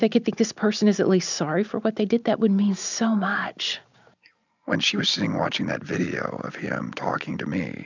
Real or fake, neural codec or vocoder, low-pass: real; none; 7.2 kHz